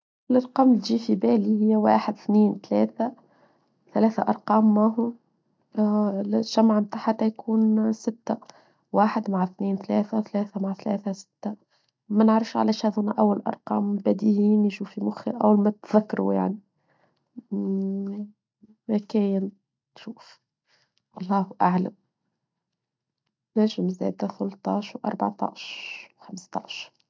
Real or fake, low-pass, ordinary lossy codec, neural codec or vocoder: real; none; none; none